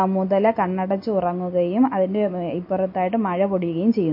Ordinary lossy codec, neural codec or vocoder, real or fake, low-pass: MP3, 32 kbps; none; real; 5.4 kHz